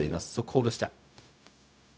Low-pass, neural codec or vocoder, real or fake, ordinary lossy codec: none; codec, 16 kHz, 0.4 kbps, LongCat-Audio-Codec; fake; none